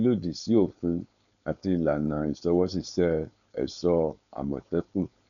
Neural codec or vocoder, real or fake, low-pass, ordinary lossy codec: codec, 16 kHz, 4.8 kbps, FACodec; fake; 7.2 kHz; none